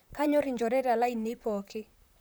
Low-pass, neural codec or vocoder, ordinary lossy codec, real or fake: none; vocoder, 44.1 kHz, 128 mel bands, Pupu-Vocoder; none; fake